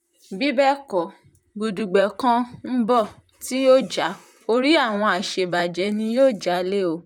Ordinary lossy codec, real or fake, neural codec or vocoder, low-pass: none; fake; vocoder, 44.1 kHz, 128 mel bands, Pupu-Vocoder; 19.8 kHz